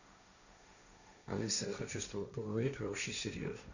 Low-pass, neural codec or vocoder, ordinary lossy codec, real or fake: 7.2 kHz; codec, 16 kHz, 1.1 kbps, Voila-Tokenizer; none; fake